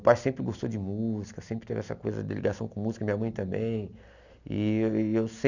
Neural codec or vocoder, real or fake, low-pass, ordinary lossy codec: none; real; 7.2 kHz; none